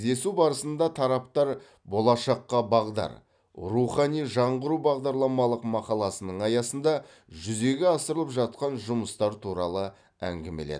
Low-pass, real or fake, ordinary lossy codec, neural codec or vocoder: 9.9 kHz; real; none; none